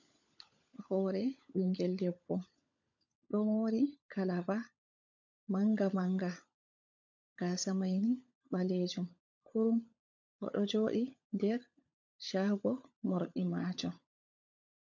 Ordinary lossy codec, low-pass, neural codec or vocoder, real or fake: MP3, 64 kbps; 7.2 kHz; codec, 16 kHz, 16 kbps, FunCodec, trained on LibriTTS, 50 frames a second; fake